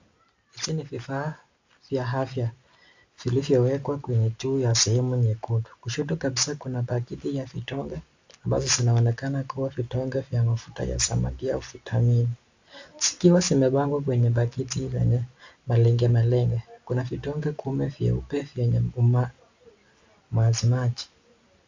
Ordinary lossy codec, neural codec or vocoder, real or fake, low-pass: AAC, 48 kbps; none; real; 7.2 kHz